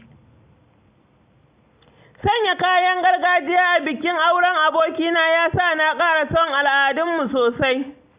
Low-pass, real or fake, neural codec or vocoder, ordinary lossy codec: 3.6 kHz; real; none; Opus, 64 kbps